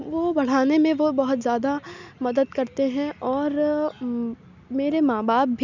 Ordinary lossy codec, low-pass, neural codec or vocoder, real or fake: none; 7.2 kHz; none; real